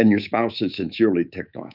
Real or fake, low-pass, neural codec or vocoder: fake; 5.4 kHz; codec, 24 kHz, 3.1 kbps, DualCodec